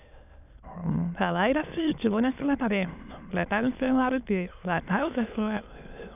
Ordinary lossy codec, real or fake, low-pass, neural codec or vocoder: none; fake; 3.6 kHz; autoencoder, 22.05 kHz, a latent of 192 numbers a frame, VITS, trained on many speakers